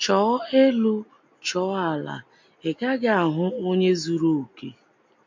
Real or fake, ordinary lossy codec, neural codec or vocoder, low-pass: real; MP3, 48 kbps; none; 7.2 kHz